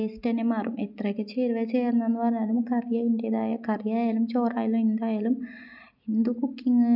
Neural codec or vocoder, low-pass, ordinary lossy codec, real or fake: none; 5.4 kHz; none; real